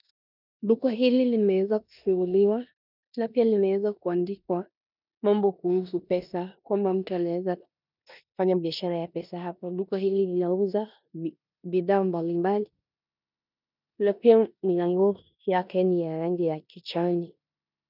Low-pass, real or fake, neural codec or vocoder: 5.4 kHz; fake; codec, 16 kHz in and 24 kHz out, 0.9 kbps, LongCat-Audio-Codec, four codebook decoder